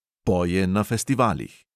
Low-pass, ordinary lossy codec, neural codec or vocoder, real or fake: 14.4 kHz; none; none; real